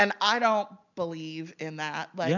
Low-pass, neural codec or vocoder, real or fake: 7.2 kHz; none; real